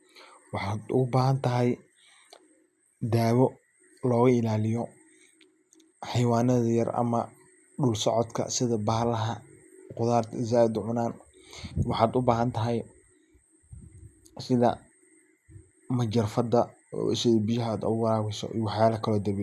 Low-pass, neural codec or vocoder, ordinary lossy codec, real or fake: 14.4 kHz; none; none; real